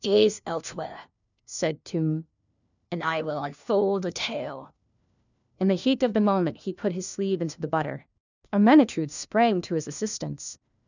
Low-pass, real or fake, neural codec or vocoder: 7.2 kHz; fake; codec, 16 kHz, 1 kbps, FunCodec, trained on LibriTTS, 50 frames a second